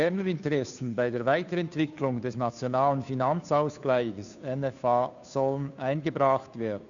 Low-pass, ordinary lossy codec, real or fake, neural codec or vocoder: 7.2 kHz; none; fake; codec, 16 kHz, 2 kbps, FunCodec, trained on Chinese and English, 25 frames a second